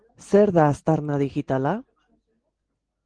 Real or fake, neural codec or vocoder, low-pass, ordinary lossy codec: real; none; 9.9 kHz; Opus, 16 kbps